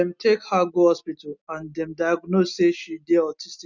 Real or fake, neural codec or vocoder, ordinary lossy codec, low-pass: real; none; none; 7.2 kHz